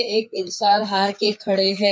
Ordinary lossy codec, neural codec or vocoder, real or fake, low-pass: none; codec, 16 kHz, 4 kbps, FreqCodec, larger model; fake; none